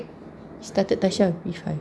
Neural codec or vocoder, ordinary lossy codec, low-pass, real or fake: none; none; none; real